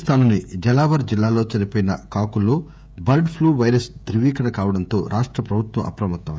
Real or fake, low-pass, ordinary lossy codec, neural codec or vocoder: fake; none; none; codec, 16 kHz, 16 kbps, FreqCodec, smaller model